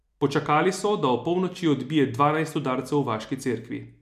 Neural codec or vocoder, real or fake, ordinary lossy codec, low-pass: none; real; MP3, 96 kbps; 14.4 kHz